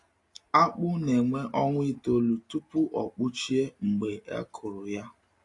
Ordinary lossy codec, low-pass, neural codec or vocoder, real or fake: AAC, 48 kbps; 10.8 kHz; none; real